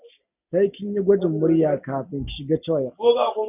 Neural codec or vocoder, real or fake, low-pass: none; real; 3.6 kHz